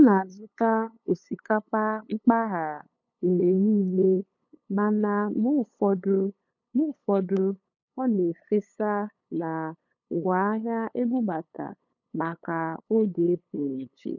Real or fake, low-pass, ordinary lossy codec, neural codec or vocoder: fake; 7.2 kHz; none; codec, 16 kHz, 8 kbps, FunCodec, trained on LibriTTS, 25 frames a second